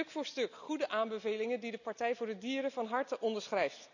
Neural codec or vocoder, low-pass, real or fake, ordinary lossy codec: none; 7.2 kHz; real; none